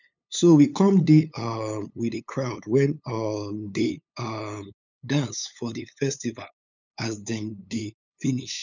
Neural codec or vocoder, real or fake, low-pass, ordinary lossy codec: codec, 16 kHz, 8 kbps, FunCodec, trained on LibriTTS, 25 frames a second; fake; 7.2 kHz; none